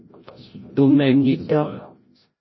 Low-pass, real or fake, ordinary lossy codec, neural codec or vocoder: 7.2 kHz; fake; MP3, 24 kbps; codec, 16 kHz, 0.5 kbps, FreqCodec, larger model